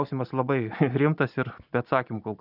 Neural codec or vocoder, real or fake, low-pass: none; real; 5.4 kHz